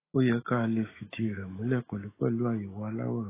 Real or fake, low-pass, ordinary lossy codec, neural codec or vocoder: fake; 3.6 kHz; AAC, 16 kbps; autoencoder, 48 kHz, 128 numbers a frame, DAC-VAE, trained on Japanese speech